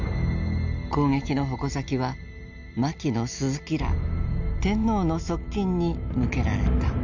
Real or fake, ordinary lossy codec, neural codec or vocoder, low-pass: real; none; none; 7.2 kHz